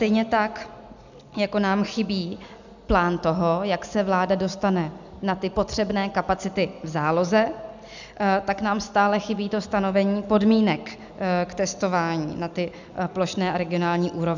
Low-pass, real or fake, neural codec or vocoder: 7.2 kHz; real; none